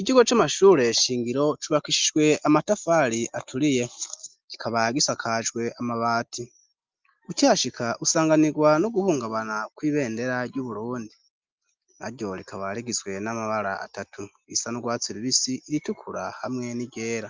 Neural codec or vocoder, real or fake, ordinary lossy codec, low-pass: none; real; Opus, 32 kbps; 7.2 kHz